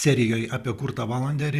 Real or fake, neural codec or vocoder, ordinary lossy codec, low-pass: real; none; Opus, 64 kbps; 14.4 kHz